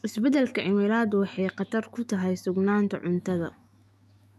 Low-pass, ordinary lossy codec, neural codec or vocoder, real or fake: 14.4 kHz; none; autoencoder, 48 kHz, 128 numbers a frame, DAC-VAE, trained on Japanese speech; fake